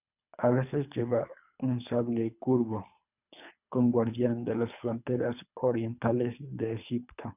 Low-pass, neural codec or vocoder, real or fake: 3.6 kHz; codec, 24 kHz, 3 kbps, HILCodec; fake